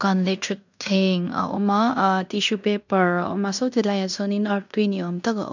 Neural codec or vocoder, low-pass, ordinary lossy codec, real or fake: codec, 16 kHz in and 24 kHz out, 0.9 kbps, LongCat-Audio-Codec, fine tuned four codebook decoder; 7.2 kHz; none; fake